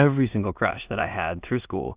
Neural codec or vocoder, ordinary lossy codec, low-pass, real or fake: codec, 16 kHz in and 24 kHz out, 0.4 kbps, LongCat-Audio-Codec, two codebook decoder; Opus, 64 kbps; 3.6 kHz; fake